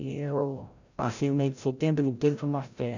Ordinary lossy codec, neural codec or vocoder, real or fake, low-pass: none; codec, 16 kHz, 0.5 kbps, FreqCodec, larger model; fake; 7.2 kHz